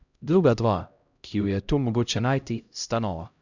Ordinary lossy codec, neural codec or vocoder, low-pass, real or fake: none; codec, 16 kHz, 0.5 kbps, X-Codec, HuBERT features, trained on LibriSpeech; 7.2 kHz; fake